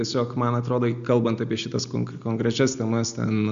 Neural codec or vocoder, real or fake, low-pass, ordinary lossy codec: none; real; 7.2 kHz; MP3, 64 kbps